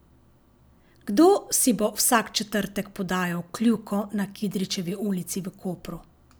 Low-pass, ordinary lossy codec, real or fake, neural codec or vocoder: none; none; real; none